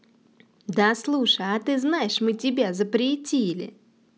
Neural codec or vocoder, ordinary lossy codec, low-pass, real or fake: none; none; none; real